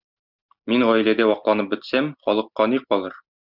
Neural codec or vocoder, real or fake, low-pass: none; real; 5.4 kHz